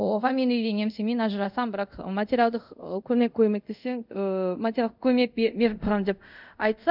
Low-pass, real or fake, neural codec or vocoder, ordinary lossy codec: 5.4 kHz; fake; codec, 24 kHz, 0.5 kbps, DualCodec; none